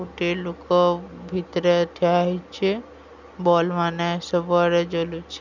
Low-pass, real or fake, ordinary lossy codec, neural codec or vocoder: 7.2 kHz; real; none; none